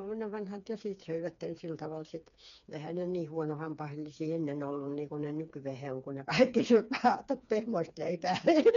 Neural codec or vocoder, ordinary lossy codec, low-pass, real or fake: codec, 16 kHz, 4 kbps, FreqCodec, smaller model; none; 7.2 kHz; fake